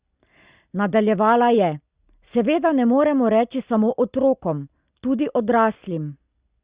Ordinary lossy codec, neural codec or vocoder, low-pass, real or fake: Opus, 64 kbps; none; 3.6 kHz; real